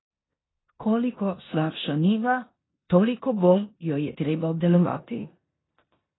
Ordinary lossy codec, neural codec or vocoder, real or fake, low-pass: AAC, 16 kbps; codec, 16 kHz in and 24 kHz out, 0.9 kbps, LongCat-Audio-Codec, four codebook decoder; fake; 7.2 kHz